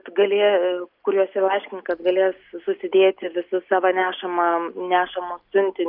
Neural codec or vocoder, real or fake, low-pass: none; real; 5.4 kHz